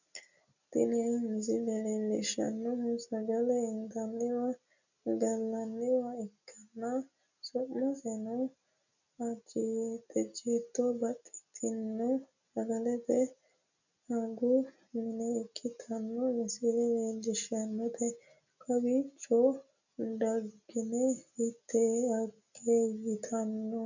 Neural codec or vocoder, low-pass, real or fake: none; 7.2 kHz; real